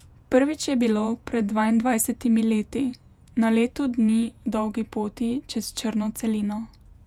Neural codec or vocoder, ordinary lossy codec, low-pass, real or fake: vocoder, 48 kHz, 128 mel bands, Vocos; none; 19.8 kHz; fake